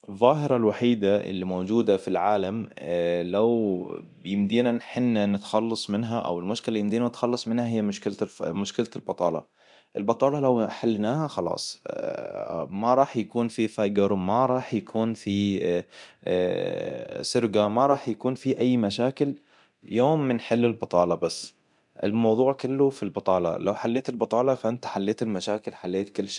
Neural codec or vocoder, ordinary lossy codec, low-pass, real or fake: codec, 24 kHz, 0.9 kbps, DualCodec; MP3, 96 kbps; 10.8 kHz; fake